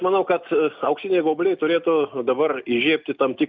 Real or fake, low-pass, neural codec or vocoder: real; 7.2 kHz; none